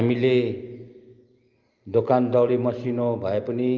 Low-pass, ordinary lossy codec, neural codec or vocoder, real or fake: 7.2 kHz; Opus, 24 kbps; none; real